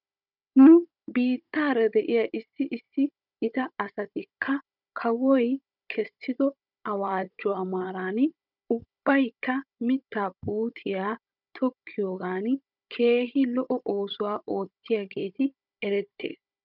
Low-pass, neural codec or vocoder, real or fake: 5.4 kHz; codec, 16 kHz, 16 kbps, FunCodec, trained on Chinese and English, 50 frames a second; fake